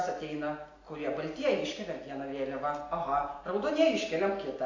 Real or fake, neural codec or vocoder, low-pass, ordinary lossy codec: real; none; 7.2 kHz; AAC, 32 kbps